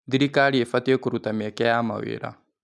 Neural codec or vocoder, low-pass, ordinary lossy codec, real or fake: none; none; none; real